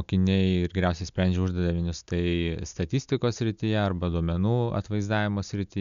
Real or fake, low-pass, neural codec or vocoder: real; 7.2 kHz; none